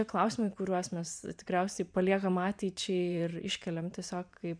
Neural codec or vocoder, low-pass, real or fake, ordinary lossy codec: vocoder, 44.1 kHz, 128 mel bands every 512 samples, BigVGAN v2; 9.9 kHz; fake; MP3, 96 kbps